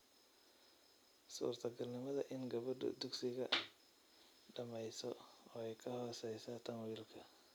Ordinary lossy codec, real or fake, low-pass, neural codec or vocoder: none; real; none; none